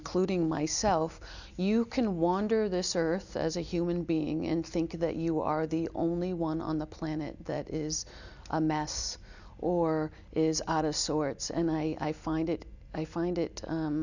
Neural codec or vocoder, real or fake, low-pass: none; real; 7.2 kHz